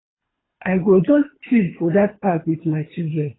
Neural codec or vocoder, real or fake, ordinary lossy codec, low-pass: codec, 24 kHz, 3 kbps, HILCodec; fake; AAC, 16 kbps; 7.2 kHz